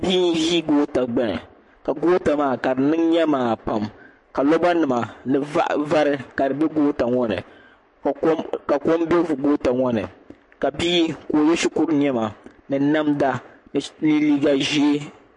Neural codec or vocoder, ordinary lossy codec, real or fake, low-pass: codec, 44.1 kHz, 7.8 kbps, Pupu-Codec; MP3, 48 kbps; fake; 10.8 kHz